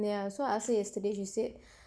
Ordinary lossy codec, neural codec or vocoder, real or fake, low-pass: none; none; real; none